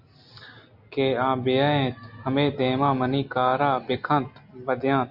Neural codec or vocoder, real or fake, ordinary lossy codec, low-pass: none; real; MP3, 48 kbps; 5.4 kHz